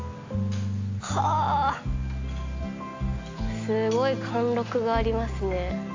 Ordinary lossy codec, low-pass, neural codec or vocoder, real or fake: AAC, 48 kbps; 7.2 kHz; none; real